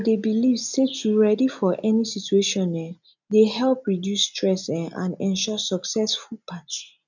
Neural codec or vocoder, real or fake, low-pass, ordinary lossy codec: none; real; 7.2 kHz; none